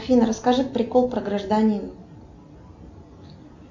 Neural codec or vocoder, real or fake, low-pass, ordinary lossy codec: none; real; 7.2 kHz; MP3, 64 kbps